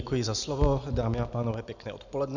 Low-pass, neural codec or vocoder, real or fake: 7.2 kHz; none; real